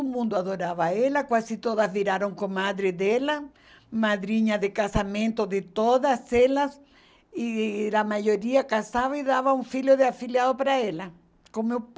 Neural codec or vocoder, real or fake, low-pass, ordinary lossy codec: none; real; none; none